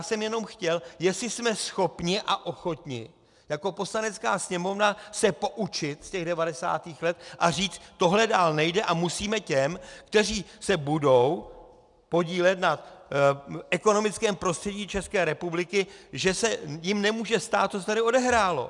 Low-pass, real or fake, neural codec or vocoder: 10.8 kHz; real; none